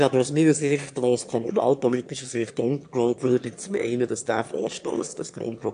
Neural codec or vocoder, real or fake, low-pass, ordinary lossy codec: autoencoder, 22.05 kHz, a latent of 192 numbers a frame, VITS, trained on one speaker; fake; 9.9 kHz; AAC, 64 kbps